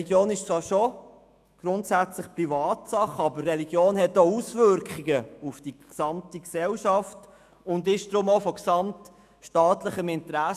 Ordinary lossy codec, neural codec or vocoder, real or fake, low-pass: none; autoencoder, 48 kHz, 128 numbers a frame, DAC-VAE, trained on Japanese speech; fake; 14.4 kHz